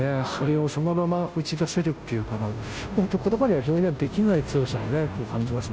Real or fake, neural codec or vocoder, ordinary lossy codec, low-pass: fake; codec, 16 kHz, 0.5 kbps, FunCodec, trained on Chinese and English, 25 frames a second; none; none